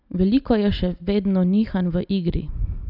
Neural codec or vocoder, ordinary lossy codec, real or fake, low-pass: none; none; real; 5.4 kHz